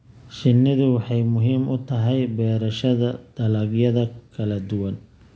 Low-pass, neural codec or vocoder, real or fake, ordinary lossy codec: none; none; real; none